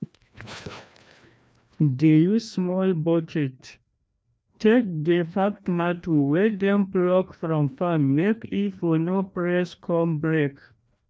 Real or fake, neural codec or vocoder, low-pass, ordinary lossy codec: fake; codec, 16 kHz, 1 kbps, FreqCodec, larger model; none; none